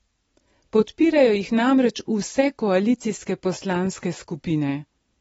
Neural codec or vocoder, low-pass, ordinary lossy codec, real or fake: none; 19.8 kHz; AAC, 24 kbps; real